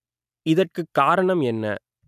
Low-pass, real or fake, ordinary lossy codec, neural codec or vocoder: 14.4 kHz; real; none; none